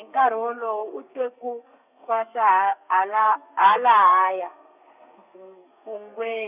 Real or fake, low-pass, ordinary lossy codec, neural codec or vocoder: fake; 3.6 kHz; none; codec, 32 kHz, 1.9 kbps, SNAC